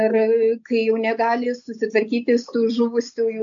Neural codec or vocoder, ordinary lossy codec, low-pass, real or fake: none; AAC, 64 kbps; 7.2 kHz; real